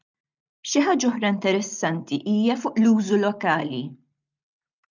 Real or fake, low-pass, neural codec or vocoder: real; 7.2 kHz; none